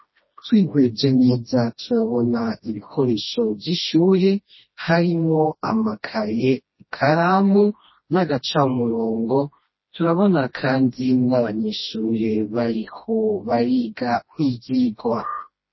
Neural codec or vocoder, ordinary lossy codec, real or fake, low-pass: codec, 16 kHz, 1 kbps, FreqCodec, smaller model; MP3, 24 kbps; fake; 7.2 kHz